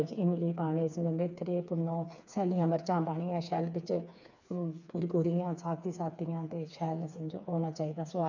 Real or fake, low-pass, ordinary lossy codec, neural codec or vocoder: fake; 7.2 kHz; none; codec, 16 kHz, 4 kbps, FreqCodec, smaller model